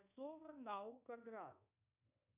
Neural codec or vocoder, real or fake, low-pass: codec, 24 kHz, 1.2 kbps, DualCodec; fake; 3.6 kHz